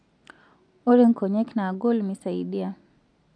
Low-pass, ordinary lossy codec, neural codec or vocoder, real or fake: 9.9 kHz; none; none; real